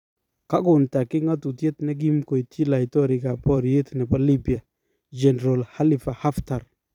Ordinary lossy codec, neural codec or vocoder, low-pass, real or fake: none; vocoder, 44.1 kHz, 128 mel bands every 256 samples, BigVGAN v2; 19.8 kHz; fake